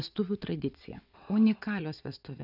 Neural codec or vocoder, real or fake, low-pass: vocoder, 22.05 kHz, 80 mel bands, WaveNeXt; fake; 5.4 kHz